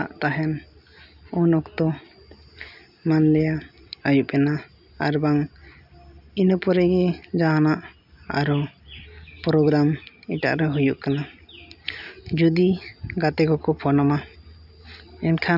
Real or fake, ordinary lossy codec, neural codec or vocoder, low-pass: real; none; none; 5.4 kHz